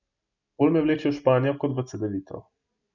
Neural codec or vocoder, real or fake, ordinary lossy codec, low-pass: none; real; none; 7.2 kHz